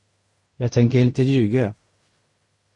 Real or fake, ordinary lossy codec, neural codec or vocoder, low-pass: fake; MP3, 48 kbps; codec, 16 kHz in and 24 kHz out, 0.4 kbps, LongCat-Audio-Codec, fine tuned four codebook decoder; 10.8 kHz